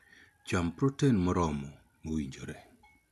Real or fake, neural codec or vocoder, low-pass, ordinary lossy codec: real; none; 14.4 kHz; none